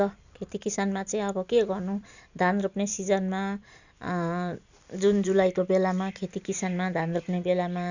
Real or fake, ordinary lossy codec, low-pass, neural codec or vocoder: fake; none; 7.2 kHz; vocoder, 44.1 kHz, 128 mel bands every 256 samples, BigVGAN v2